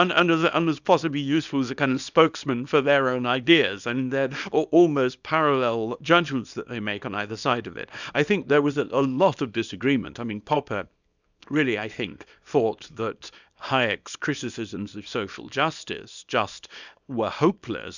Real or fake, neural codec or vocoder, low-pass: fake; codec, 24 kHz, 0.9 kbps, WavTokenizer, small release; 7.2 kHz